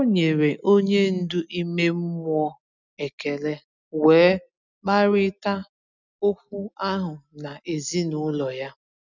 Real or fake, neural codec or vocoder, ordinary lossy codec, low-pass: real; none; none; 7.2 kHz